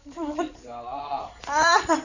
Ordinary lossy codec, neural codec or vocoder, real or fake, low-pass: none; codec, 16 kHz in and 24 kHz out, 2.2 kbps, FireRedTTS-2 codec; fake; 7.2 kHz